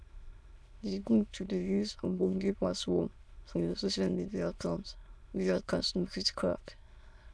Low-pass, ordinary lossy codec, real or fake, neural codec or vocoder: none; none; fake; autoencoder, 22.05 kHz, a latent of 192 numbers a frame, VITS, trained on many speakers